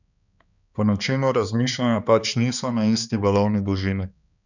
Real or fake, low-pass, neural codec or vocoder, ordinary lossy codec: fake; 7.2 kHz; codec, 16 kHz, 2 kbps, X-Codec, HuBERT features, trained on balanced general audio; none